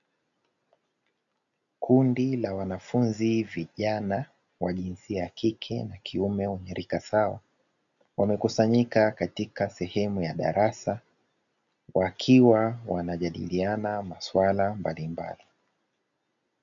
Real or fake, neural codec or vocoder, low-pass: real; none; 7.2 kHz